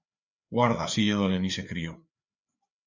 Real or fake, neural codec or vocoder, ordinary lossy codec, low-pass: fake; codec, 16 kHz, 4 kbps, FreqCodec, larger model; Opus, 64 kbps; 7.2 kHz